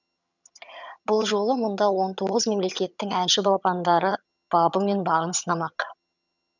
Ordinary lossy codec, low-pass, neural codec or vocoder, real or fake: none; 7.2 kHz; vocoder, 22.05 kHz, 80 mel bands, HiFi-GAN; fake